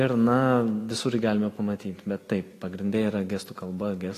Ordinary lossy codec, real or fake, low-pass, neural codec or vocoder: AAC, 48 kbps; real; 14.4 kHz; none